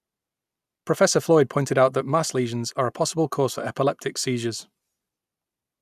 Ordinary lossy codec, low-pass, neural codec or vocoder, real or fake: none; 14.4 kHz; none; real